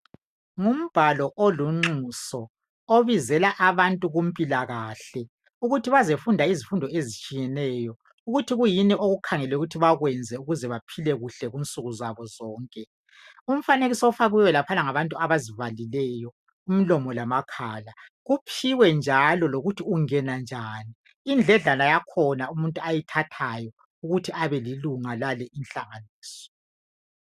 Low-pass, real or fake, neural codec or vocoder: 14.4 kHz; real; none